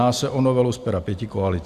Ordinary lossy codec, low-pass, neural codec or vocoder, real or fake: Opus, 64 kbps; 14.4 kHz; none; real